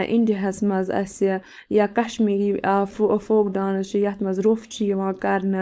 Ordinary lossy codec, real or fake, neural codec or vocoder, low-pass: none; fake; codec, 16 kHz, 4.8 kbps, FACodec; none